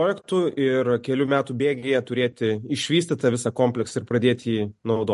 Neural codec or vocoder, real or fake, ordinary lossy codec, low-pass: none; real; MP3, 48 kbps; 14.4 kHz